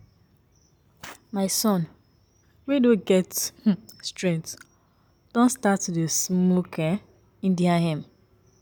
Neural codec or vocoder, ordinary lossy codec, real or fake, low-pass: none; none; real; none